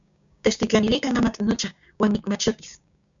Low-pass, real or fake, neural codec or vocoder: 7.2 kHz; fake; codec, 16 kHz, 6 kbps, DAC